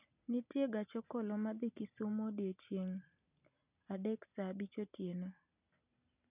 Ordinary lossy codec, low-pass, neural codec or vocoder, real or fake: none; 3.6 kHz; none; real